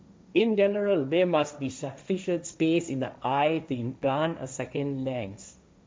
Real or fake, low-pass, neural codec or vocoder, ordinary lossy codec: fake; none; codec, 16 kHz, 1.1 kbps, Voila-Tokenizer; none